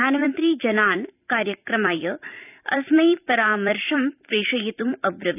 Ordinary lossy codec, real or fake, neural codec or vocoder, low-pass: none; fake; vocoder, 44.1 kHz, 128 mel bands every 256 samples, BigVGAN v2; 3.6 kHz